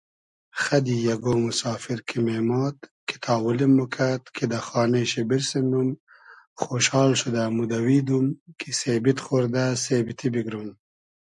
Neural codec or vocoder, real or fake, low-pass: none; real; 10.8 kHz